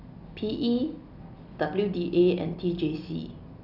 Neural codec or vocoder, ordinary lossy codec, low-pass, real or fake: none; none; 5.4 kHz; real